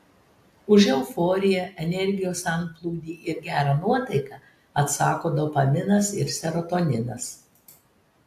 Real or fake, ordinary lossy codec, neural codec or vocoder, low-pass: real; AAC, 64 kbps; none; 14.4 kHz